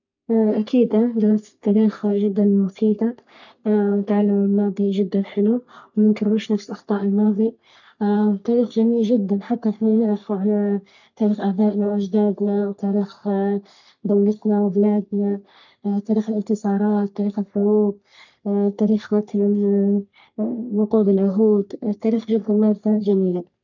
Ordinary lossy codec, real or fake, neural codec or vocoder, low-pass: none; fake; codec, 44.1 kHz, 3.4 kbps, Pupu-Codec; 7.2 kHz